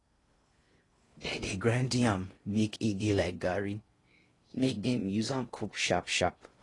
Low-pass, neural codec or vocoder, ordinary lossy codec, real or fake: 10.8 kHz; codec, 16 kHz in and 24 kHz out, 0.6 kbps, FocalCodec, streaming, 2048 codes; AAC, 32 kbps; fake